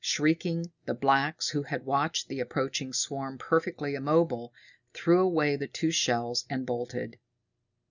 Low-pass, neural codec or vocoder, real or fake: 7.2 kHz; none; real